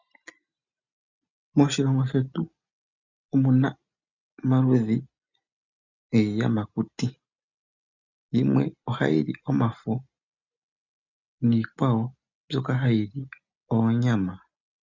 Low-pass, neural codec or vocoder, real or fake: 7.2 kHz; none; real